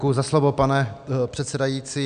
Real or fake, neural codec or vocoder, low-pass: real; none; 9.9 kHz